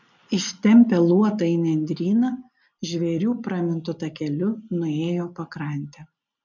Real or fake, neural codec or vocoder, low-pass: real; none; 7.2 kHz